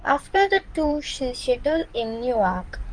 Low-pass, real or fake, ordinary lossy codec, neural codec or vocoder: 9.9 kHz; fake; Opus, 32 kbps; codec, 16 kHz in and 24 kHz out, 2.2 kbps, FireRedTTS-2 codec